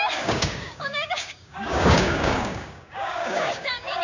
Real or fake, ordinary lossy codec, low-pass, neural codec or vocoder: fake; none; 7.2 kHz; codec, 16 kHz, 6 kbps, DAC